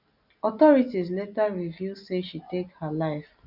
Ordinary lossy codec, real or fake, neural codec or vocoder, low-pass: none; real; none; 5.4 kHz